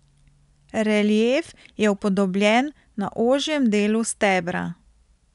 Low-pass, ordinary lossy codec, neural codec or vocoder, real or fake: 10.8 kHz; none; none; real